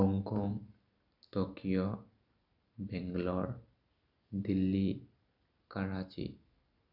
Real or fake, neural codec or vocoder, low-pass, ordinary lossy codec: real; none; 5.4 kHz; none